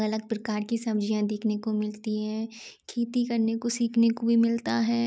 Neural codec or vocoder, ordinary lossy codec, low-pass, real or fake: none; none; none; real